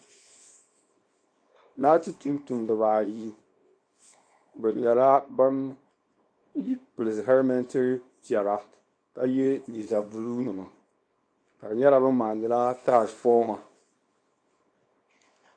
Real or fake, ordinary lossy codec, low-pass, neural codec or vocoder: fake; MP3, 48 kbps; 9.9 kHz; codec, 24 kHz, 0.9 kbps, WavTokenizer, small release